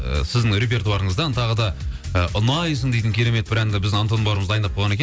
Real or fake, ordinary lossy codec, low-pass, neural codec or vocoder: real; none; none; none